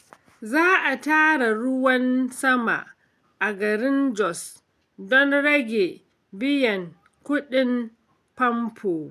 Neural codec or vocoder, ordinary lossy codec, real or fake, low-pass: none; MP3, 96 kbps; real; 14.4 kHz